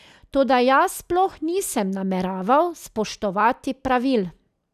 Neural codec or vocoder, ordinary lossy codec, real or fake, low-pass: none; none; real; 14.4 kHz